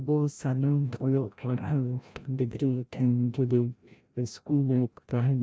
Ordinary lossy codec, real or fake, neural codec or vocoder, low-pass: none; fake; codec, 16 kHz, 0.5 kbps, FreqCodec, larger model; none